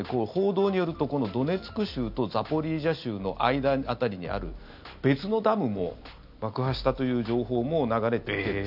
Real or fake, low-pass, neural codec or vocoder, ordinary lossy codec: real; 5.4 kHz; none; none